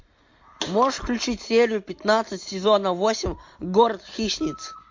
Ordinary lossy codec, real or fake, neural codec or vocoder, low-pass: MP3, 48 kbps; real; none; 7.2 kHz